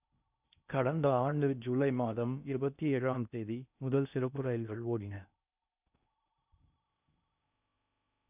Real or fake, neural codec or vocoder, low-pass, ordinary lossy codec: fake; codec, 16 kHz in and 24 kHz out, 0.6 kbps, FocalCodec, streaming, 4096 codes; 3.6 kHz; none